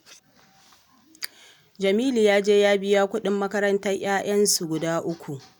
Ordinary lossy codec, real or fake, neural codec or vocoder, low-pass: none; real; none; 19.8 kHz